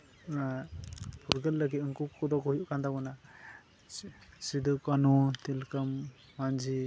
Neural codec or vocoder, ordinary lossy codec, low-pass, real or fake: none; none; none; real